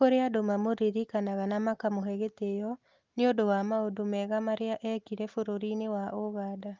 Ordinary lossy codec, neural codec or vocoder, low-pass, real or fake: Opus, 32 kbps; none; 7.2 kHz; real